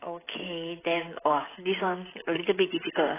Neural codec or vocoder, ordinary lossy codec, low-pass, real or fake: codec, 16 kHz, 16 kbps, FreqCodec, smaller model; AAC, 16 kbps; 3.6 kHz; fake